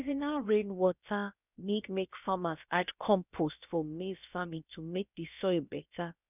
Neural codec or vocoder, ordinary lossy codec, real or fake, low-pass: codec, 16 kHz, about 1 kbps, DyCAST, with the encoder's durations; none; fake; 3.6 kHz